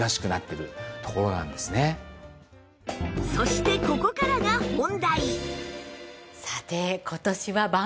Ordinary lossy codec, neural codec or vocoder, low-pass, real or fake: none; none; none; real